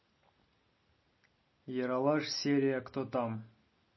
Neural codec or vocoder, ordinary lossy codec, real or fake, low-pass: none; MP3, 24 kbps; real; 7.2 kHz